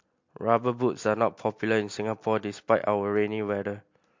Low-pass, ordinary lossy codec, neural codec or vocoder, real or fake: 7.2 kHz; MP3, 48 kbps; none; real